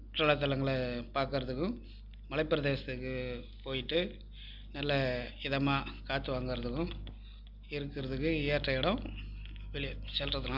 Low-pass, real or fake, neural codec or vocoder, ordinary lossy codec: 5.4 kHz; real; none; Opus, 64 kbps